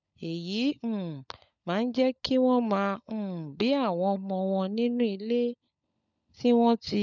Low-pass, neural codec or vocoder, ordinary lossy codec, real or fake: 7.2 kHz; codec, 16 kHz, 16 kbps, FunCodec, trained on LibriTTS, 50 frames a second; none; fake